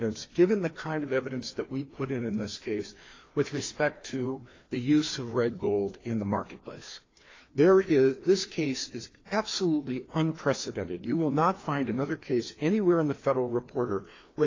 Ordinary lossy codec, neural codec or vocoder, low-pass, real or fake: AAC, 32 kbps; codec, 16 kHz, 2 kbps, FreqCodec, larger model; 7.2 kHz; fake